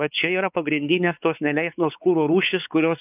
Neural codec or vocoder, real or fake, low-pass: codec, 16 kHz, 4 kbps, X-Codec, WavLM features, trained on Multilingual LibriSpeech; fake; 3.6 kHz